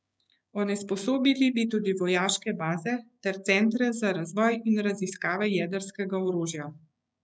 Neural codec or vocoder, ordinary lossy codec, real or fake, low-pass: codec, 16 kHz, 6 kbps, DAC; none; fake; none